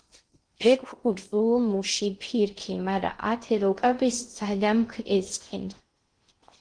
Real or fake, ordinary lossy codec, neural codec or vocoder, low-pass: fake; Opus, 24 kbps; codec, 16 kHz in and 24 kHz out, 0.6 kbps, FocalCodec, streaming, 2048 codes; 9.9 kHz